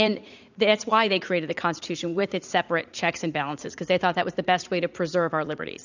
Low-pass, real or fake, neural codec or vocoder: 7.2 kHz; fake; vocoder, 44.1 kHz, 80 mel bands, Vocos